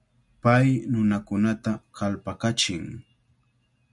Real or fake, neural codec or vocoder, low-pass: real; none; 10.8 kHz